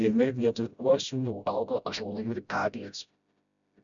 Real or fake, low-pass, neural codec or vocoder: fake; 7.2 kHz; codec, 16 kHz, 0.5 kbps, FreqCodec, smaller model